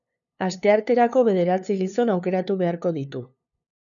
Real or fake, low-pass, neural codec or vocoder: fake; 7.2 kHz; codec, 16 kHz, 2 kbps, FunCodec, trained on LibriTTS, 25 frames a second